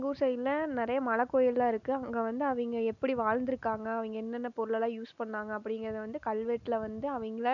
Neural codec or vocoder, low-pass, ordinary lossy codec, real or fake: none; 7.2 kHz; none; real